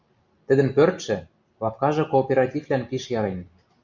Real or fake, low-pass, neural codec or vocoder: real; 7.2 kHz; none